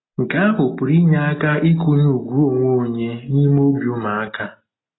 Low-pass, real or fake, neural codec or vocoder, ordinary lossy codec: 7.2 kHz; real; none; AAC, 16 kbps